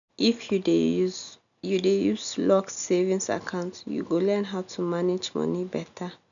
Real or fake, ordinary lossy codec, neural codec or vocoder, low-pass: real; none; none; 7.2 kHz